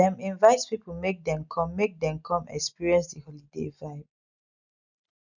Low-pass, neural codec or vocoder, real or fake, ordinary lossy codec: 7.2 kHz; none; real; none